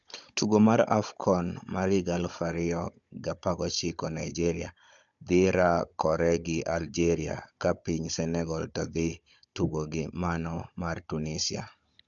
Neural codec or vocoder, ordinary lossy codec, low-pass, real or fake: codec, 16 kHz, 16 kbps, FunCodec, trained on Chinese and English, 50 frames a second; MP3, 64 kbps; 7.2 kHz; fake